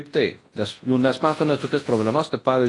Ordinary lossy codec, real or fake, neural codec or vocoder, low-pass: AAC, 32 kbps; fake; codec, 24 kHz, 0.9 kbps, WavTokenizer, large speech release; 10.8 kHz